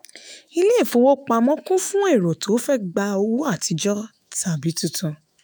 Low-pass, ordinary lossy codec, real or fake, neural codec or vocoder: none; none; fake; autoencoder, 48 kHz, 128 numbers a frame, DAC-VAE, trained on Japanese speech